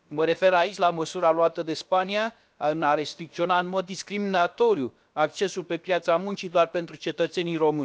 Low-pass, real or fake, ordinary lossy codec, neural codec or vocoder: none; fake; none; codec, 16 kHz, about 1 kbps, DyCAST, with the encoder's durations